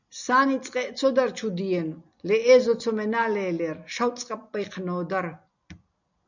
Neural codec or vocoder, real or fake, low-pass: none; real; 7.2 kHz